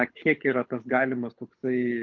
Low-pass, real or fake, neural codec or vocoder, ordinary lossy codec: 7.2 kHz; fake; codec, 24 kHz, 6 kbps, HILCodec; Opus, 24 kbps